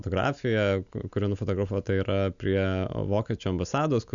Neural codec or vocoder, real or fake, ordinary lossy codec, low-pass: none; real; MP3, 96 kbps; 7.2 kHz